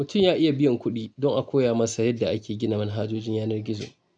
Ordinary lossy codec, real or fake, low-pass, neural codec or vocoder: none; real; 9.9 kHz; none